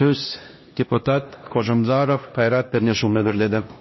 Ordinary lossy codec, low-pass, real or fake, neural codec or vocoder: MP3, 24 kbps; 7.2 kHz; fake; codec, 16 kHz, 1 kbps, X-Codec, HuBERT features, trained on LibriSpeech